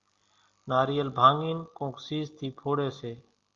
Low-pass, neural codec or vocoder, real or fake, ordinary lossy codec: 7.2 kHz; none; real; Opus, 32 kbps